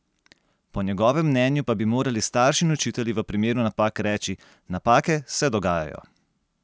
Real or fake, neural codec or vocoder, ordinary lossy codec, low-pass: real; none; none; none